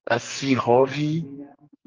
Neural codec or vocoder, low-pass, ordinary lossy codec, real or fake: codec, 44.1 kHz, 2.6 kbps, SNAC; 7.2 kHz; Opus, 24 kbps; fake